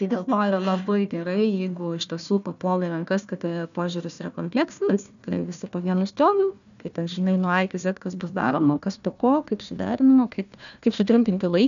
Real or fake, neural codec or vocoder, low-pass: fake; codec, 16 kHz, 1 kbps, FunCodec, trained on Chinese and English, 50 frames a second; 7.2 kHz